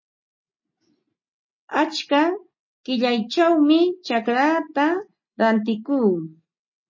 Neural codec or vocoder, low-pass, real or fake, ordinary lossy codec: none; 7.2 kHz; real; MP3, 32 kbps